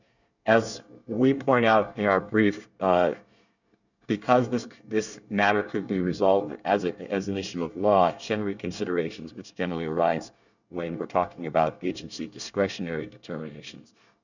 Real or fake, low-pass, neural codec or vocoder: fake; 7.2 kHz; codec, 24 kHz, 1 kbps, SNAC